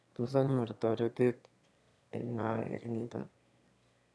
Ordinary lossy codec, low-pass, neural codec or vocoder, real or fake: none; none; autoencoder, 22.05 kHz, a latent of 192 numbers a frame, VITS, trained on one speaker; fake